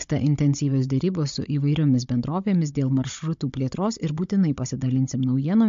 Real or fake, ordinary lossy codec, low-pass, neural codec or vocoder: fake; MP3, 48 kbps; 7.2 kHz; codec, 16 kHz, 16 kbps, FunCodec, trained on Chinese and English, 50 frames a second